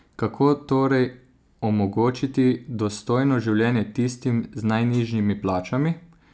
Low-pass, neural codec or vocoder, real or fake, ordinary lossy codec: none; none; real; none